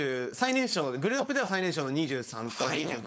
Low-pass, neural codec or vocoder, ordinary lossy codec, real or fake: none; codec, 16 kHz, 4.8 kbps, FACodec; none; fake